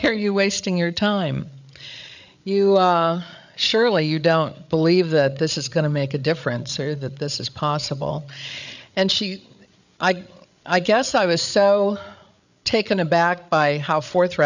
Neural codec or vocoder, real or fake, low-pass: codec, 16 kHz, 8 kbps, FreqCodec, larger model; fake; 7.2 kHz